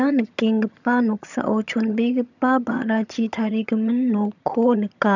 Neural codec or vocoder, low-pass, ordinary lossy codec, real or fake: vocoder, 22.05 kHz, 80 mel bands, HiFi-GAN; 7.2 kHz; none; fake